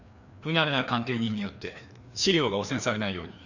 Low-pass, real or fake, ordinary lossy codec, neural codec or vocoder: 7.2 kHz; fake; none; codec, 16 kHz, 2 kbps, FreqCodec, larger model